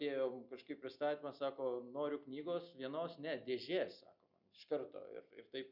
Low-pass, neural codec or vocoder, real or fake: 5.4 kHz; none; real